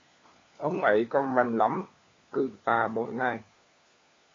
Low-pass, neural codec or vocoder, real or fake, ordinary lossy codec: 7.2 kHz; codec, 16 kHz, 4 kbps, FunCodec, trained on LibriTTS, 50 frames a second; fake; AAC, 32 kbps